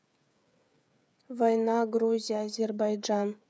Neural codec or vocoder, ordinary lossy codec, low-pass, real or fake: codec, 16 kHz, 16 kbps, FreqCodec, smaller model; none; none; fake